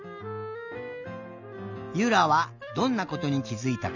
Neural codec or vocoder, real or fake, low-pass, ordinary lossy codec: none; real; 7.2 kHz; none